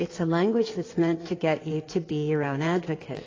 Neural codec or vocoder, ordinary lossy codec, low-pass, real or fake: codec, 16 kHz in and 24 kHz out, 2.2 kbps, FireRedTTS-2 codec; AAC, 32 kbps; 7.2 kHz; fake